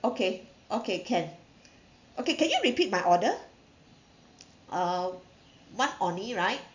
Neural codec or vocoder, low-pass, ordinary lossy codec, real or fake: none; 7.2 kHz; none; real